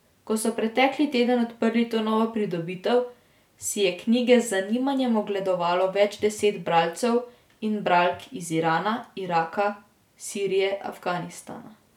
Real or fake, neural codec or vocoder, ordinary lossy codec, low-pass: real; none; none; 19.8 kHz